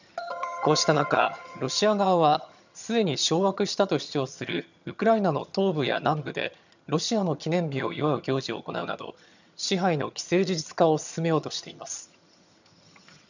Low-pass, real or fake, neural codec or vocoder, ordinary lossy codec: 7.2 kHz; fake; vocoder, 22.05 kHz, 80 mel bands, HiFi-GAN; none